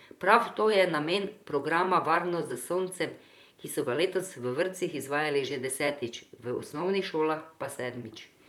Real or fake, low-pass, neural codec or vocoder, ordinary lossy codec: fake; 19.8 kHz; vocoder, 44.1 kHz, 128 mel bands, Pupu-Vocoder; none